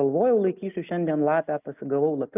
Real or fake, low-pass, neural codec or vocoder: real; 3.6 kHz; none